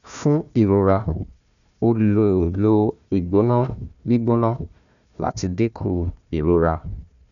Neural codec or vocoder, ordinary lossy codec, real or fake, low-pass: codec, 16 kHz, 1 kbps, FunCodec, trained on Chinese and English, 50 frames a second; none; fake; 7.2 kHz